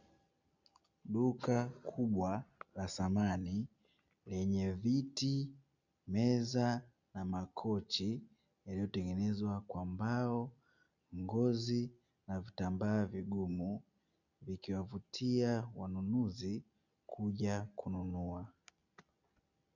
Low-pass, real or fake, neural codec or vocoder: 7.2 kHz; real; none